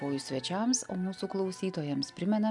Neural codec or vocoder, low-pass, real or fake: none; 10.8 kHz; real